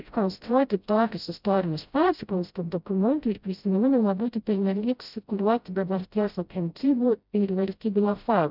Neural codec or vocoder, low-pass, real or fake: codec, 16 kHz, 0.5 kbps, FreqCodec, smaller model; 5.4 kHz; fake